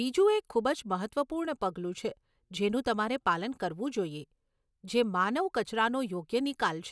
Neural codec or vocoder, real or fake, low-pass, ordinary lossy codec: none; real; none; none